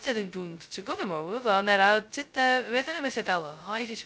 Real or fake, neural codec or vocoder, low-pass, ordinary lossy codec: fake; codec, 16 kHz, 0.2 kbps, FocalCodec; none; none